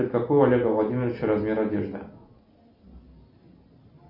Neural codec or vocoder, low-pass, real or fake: none; 5.4 kHz; real